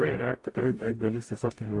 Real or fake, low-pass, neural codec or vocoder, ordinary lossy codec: fake; 10.8 kHz; codec, 44.1 kHz, 0.9 kbps, DAC; AAC, 48 kbps